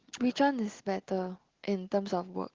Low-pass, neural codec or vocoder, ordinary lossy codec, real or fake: 7.2 kHz; none; Opus, 16 kbps; real